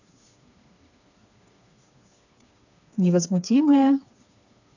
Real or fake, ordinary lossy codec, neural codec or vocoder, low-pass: fake; none; codec, 16 kHz, 4 kbps, FreqCodec, smaller model; 7.2 kHz